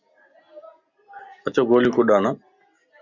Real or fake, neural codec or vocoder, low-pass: real; none; 7.2 kHz